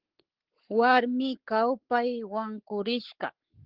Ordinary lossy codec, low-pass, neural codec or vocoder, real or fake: Opus, 16 kbps; 5.4 kHz; codec, 44.1 kHz, 7.8 kbps, Pupu-Codec; fake